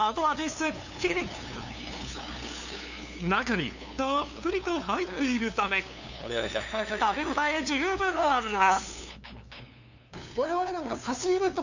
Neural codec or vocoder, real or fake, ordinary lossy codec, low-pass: codec, 16 kHz, 2 kbps, FunCodec, trained on LibriTTS, 25 frames a second; fake; none; 7.2 kHz